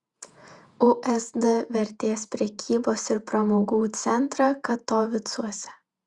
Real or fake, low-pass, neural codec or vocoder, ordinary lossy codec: fake; 10.8 kHz; vocoder, 48 kHz, 128 mel bands, Vocos; Opus, 64 kbps